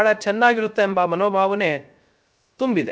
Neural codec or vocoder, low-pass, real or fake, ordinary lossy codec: codec, 16 kHz, 0.3 kbps, FocalCodec; none; fake; none